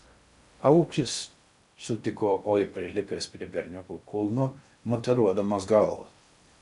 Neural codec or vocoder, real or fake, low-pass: codec, 16 kHz in and 24 kHz out, 0.6 kbps, FocalCodec, streaming, 2048 codes; fake; 10.8 kHz